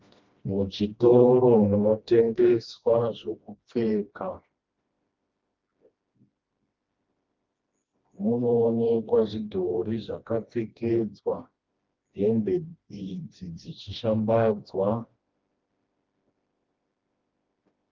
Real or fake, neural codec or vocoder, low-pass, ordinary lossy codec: fake; codec, 16 kHz, 1 kbps, FreqCodec, smaller model; 7.2 kHz; Opus, 32 kbps